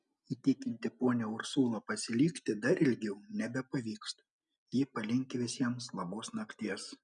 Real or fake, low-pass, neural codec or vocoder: real; 10.8 kHz; none